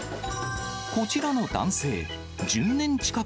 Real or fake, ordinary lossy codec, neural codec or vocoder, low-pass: real; none; none; none